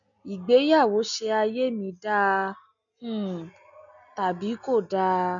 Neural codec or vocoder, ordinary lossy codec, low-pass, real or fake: none; none; 7.2 kHz; real